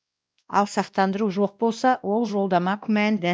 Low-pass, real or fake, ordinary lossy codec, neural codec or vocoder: none; fake; none; codec, 16 kHz, 1 kbps, X-Codec, WavLM features, trained on Multilingual LibriSpeech